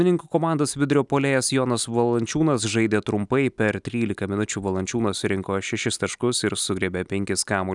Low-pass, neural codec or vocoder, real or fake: 10.8 kHz; none; real